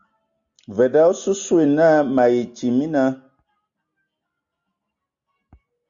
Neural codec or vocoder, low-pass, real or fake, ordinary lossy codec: none; 7.2 kHz; real; Opus, 64 kbps